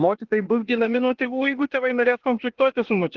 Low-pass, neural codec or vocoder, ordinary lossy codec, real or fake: 7.2 kHz; codec, 16 kHz, 0.8 kbps, ZipCodec; Opus, 16 kbps; fake